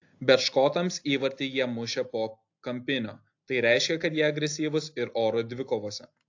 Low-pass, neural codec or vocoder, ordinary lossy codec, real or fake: 7.2 kHz; none; AAC, 48 kbps; real